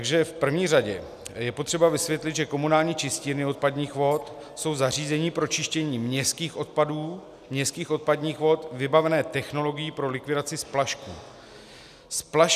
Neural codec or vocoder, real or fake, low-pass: none; real; 14.4 kHz